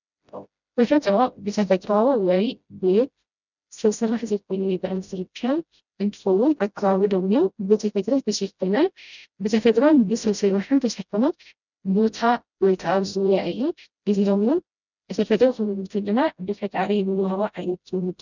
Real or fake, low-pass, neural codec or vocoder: fake; 7.2 kHz; codec, 16 kHz, 0.5 kbps, FreqCodec, smaller model